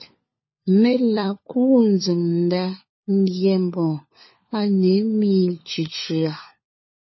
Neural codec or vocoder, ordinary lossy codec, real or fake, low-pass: codec, 16 kHz, 4 kbps, FunCodec, trained on LibriTTS, 50 frames a second; MP3, 24 kbps; fake; 7.2 kHz